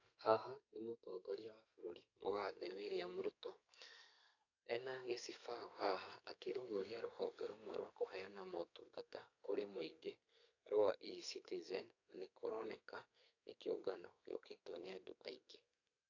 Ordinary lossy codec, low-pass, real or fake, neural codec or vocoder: AAC, 48 kbps; 7.2 kHz; fake; codec, 32 kHz, 1.9 kbps, SNAC